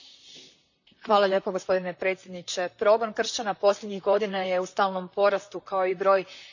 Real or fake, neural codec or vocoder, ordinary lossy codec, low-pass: fake; vocoder, 44.1 kHz, 128 mel bands, Pupu-Vocoder; none; 7.2 kHz